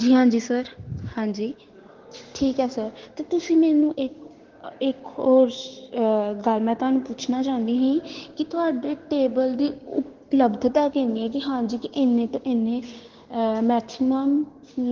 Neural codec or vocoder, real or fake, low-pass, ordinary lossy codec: codec, 16 kHz, 6 kbps, DAC; fake; 7.2 kHz; Opus, 16 kbps